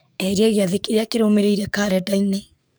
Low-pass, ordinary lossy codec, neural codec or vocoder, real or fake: none; none; codec, 44.1 kHz, 7.8 kbps, DAC; fake